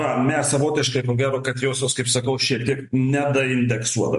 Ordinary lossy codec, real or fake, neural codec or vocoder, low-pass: MP3, 48 kbps; real; none; 14.4 kHz